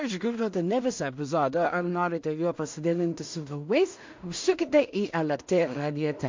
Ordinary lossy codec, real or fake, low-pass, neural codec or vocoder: MP3, 48 kbps; fake; 7.2 kHz; codec, 16 kHz in and 24 kHz out, 0.4 kbps, LongCat-Audio-Codec, two codebook decoder